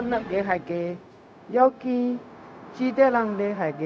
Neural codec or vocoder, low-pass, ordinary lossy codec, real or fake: codec, 16 kHz, 0.4 kbps, LongCat-Audio-Codec; none; none; fake